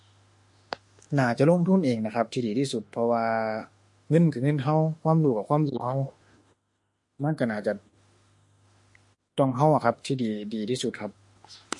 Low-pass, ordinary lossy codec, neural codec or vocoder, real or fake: 10.8 kHz; MP3, 48 kbps; autoencoder, 48 kHz, 32 numbers a frame, DAC-VAE, trained on Japanese speech; fake